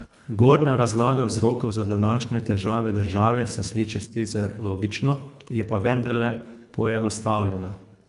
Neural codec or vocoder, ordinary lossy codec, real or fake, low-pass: codec, 24 kHz, 1.5 kbps, HILCodec; none; fake; 10.8 kHz